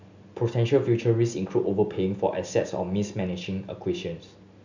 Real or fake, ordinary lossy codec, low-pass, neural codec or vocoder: real; none; 7.2 kHz; none